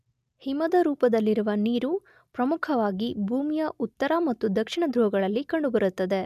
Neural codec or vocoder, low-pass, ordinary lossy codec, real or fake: none; 14.4 kHz; none; real